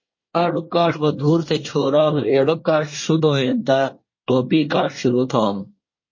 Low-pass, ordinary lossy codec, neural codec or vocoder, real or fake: 7.2 kHz; MP3, 32 kbps; codec, 24 kHz, 1 kbps, SNAC; fake